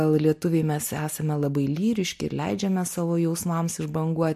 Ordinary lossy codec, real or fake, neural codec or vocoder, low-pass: MP3, 64 kbps; real; none; 14.4 kHz